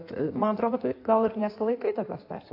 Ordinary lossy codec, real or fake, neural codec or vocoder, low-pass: AAC, 32 kbps; fake; codec, 16 kHz in and 24 kHz out, 1.1 kbps, FireRedTTS-2 codec; 5.4 kHz